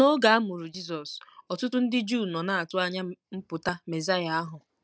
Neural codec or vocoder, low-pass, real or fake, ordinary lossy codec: none; none; real; none